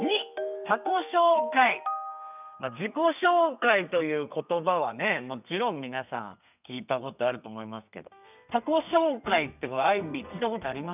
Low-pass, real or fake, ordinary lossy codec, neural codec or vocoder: 3.6 kHz; fake; none; codec, 32 kHz, 1.9 kbps, SNAC